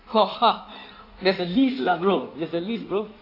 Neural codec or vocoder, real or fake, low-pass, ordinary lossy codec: codec, 16 kHz in and 24 kHz out, 1.1 kbps, FireRedTTS-2 codec; fake; 5.4 kHz; AAC, 32 kbps